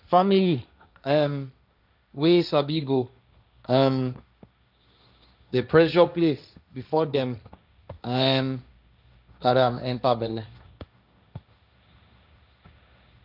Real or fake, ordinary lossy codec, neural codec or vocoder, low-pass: fake; none; codec, 16 kHz, 1.1 kbps, Voila-Tokenizer; 5.4 kHz